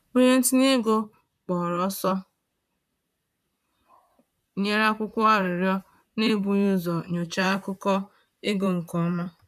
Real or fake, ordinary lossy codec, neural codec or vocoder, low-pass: fake; none; vocoder, 44.1 kHz, 128 mel bands, Pupu-Vocoder; 14.4 kHz